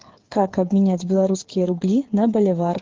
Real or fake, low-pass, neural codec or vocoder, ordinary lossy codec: fake; 7.2 kHz; codec, 16 kHz, 8 kbps, FreqCodec, smaller model; Opus, 16 kbps